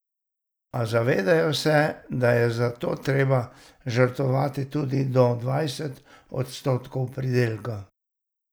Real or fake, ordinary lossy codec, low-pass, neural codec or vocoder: real; none; none; none